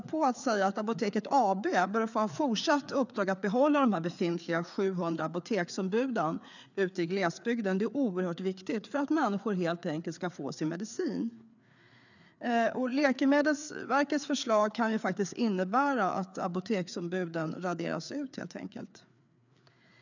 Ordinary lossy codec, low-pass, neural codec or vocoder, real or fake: none; 7.2 kHz; codec, 16 kHz, 4 kbps, FreqCodec, larger model; fake